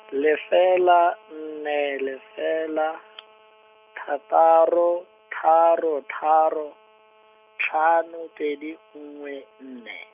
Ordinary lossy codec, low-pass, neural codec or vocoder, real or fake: none; 3.6 kHz; none; real